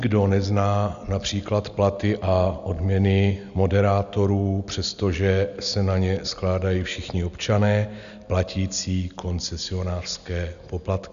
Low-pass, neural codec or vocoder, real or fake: 7.2 kHz; none; real